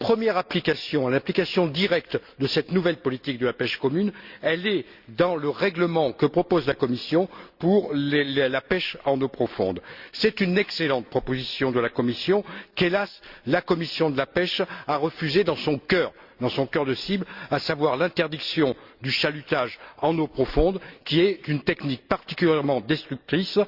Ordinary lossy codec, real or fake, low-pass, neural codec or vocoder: Opus, 64 kbps; real; 5.4 kHz; none